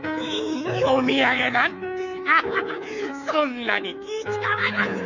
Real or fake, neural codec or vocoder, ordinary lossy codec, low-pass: fake; codec, 24 kHz, 3.1 kbps, DualCodec; none; 7.2 kHz